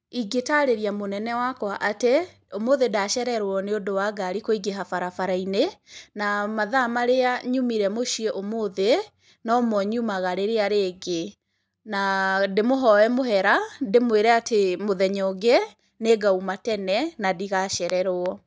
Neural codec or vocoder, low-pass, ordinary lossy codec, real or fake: none; none; none; real